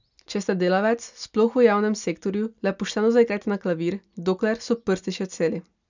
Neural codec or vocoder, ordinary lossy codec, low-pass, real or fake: none; none; 7.2 kHz; real